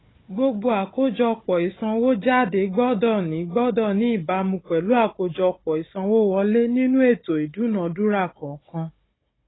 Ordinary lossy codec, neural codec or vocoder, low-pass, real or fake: AAC, 16 kbps; codec, 16 kHz, 16 kbps, FunCodec, trained on Chinese and English, 50 frames a second; 7.2 kHz; fake